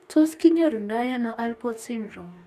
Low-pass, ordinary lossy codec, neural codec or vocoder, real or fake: 14.4 kHz; none; codec, 44.1 kHz, 2.6 kbps, DAC; fake